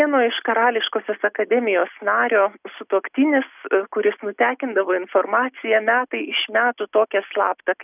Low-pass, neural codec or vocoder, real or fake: 3.6 kHz; none; real